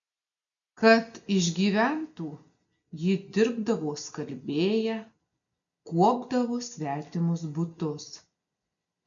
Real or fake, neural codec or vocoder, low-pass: real; none; 7.2 kHz